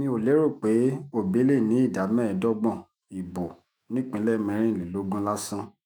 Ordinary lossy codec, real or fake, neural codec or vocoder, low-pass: none; fake; autoencoder, 48 kHz, 128 numbers a frame, DAC-VAE, trained on Japanese speech; none